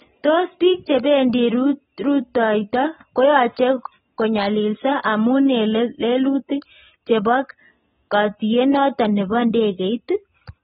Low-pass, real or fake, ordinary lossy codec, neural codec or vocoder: 7.2 kHz; real; AAC, 16 kbps; none